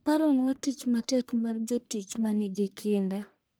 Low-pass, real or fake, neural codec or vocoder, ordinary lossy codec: none; fake; codec, 44.1 kHz, 1.7 kbps, Pupu-Codec; none